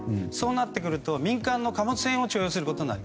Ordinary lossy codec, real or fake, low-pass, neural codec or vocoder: none; real; none; none